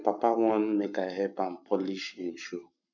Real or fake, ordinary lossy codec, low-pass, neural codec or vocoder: fake; none; 7.2 kHz; codec, 44.1 kHz, 7.8 kbps, Pupu-Codec